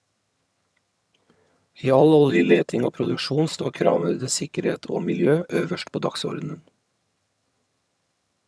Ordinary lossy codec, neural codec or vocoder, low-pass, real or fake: none; vocoder, 22.05 kHz, 80 mel bands, HiFi-GAN; none; fake